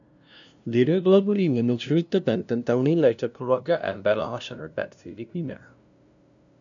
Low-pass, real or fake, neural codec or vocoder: 7.2 kHz; fake; codec, 16 kHz, 0.5 kbps, FunCodec, trained on LibriTTS, 25 frames a second